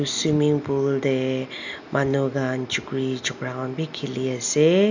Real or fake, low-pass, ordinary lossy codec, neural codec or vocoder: real; 7.2 kHz; none; none